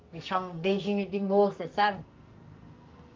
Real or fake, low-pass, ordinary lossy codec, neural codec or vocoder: fake; 7.2 kHz; Opus, 32 kbps; codec, 44.1 kHz, 2.6 kbps, SNAC